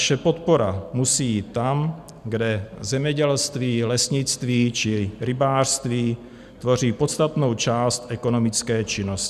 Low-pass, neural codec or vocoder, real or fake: 14.4 kHz; none; real